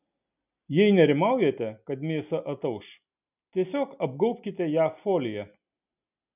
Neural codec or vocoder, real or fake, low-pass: none; real; 3.6 kHz